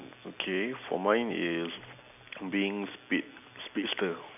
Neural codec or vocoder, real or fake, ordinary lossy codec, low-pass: none; real; none; 3.6 kHz